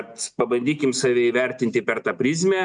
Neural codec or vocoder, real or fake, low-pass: none; real; 9.9 kHz